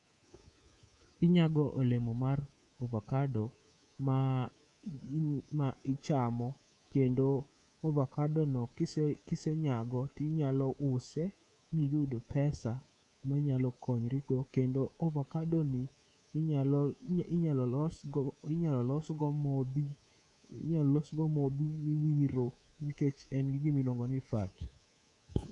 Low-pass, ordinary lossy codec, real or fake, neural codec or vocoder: 10.8 kHz; none; fake; codec, 24 kHz, 3.1 kbps, DualCodec